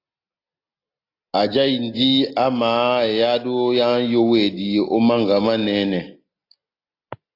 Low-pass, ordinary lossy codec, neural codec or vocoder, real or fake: 5.4 kHz; AAC, 32 kbps; none; real